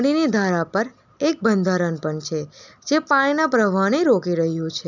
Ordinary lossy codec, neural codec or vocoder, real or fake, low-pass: none; none; real; 7.2 kHz